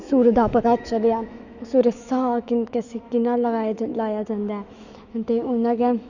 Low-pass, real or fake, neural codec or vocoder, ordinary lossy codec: 7.2 kHz; fake; autoencoder, 48 kHz, 128 numbers a frame, DAC-VAE, trained on Japanese speech; none